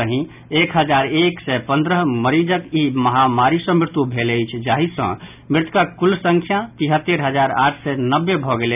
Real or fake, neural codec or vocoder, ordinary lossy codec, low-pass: real; none; none; 3.6 kHz